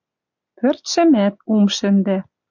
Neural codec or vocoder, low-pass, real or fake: none; 7.2 kHz; real